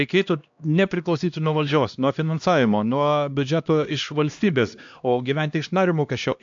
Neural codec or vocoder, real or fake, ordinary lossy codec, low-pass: codec, 16 kHz, 1 kbps, X-Codec, HuBERT features, trained on LibriSpeech; fake; AAC, 64 kbps; 7.2 kHz